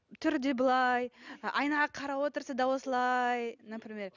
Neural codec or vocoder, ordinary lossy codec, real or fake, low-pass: none; none; real; 7.2 kHz